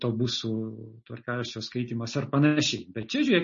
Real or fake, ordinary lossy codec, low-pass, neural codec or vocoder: real; MP3, 32 kbps; 7.2 kHz; none